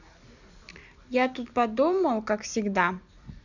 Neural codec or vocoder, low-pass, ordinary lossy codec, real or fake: none; 7.2 kHz; none; real